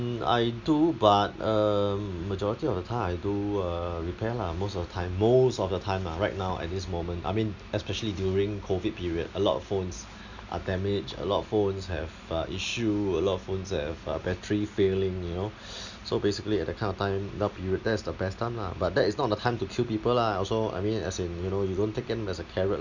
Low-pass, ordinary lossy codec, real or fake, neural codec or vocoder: 7.2 kHz; none; real; none